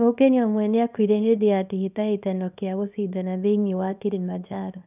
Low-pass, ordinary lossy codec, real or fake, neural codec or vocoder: 3.6 kHz; none; fake; codec, 24 kHz, 0.9 kbps, WavTokenizer, small release